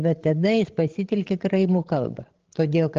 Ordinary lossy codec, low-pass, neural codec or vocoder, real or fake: Opus, 16 kbps; 7.2 kHz; codec, 16 kHz, 16 kbps, FreqCodec, larger model; fake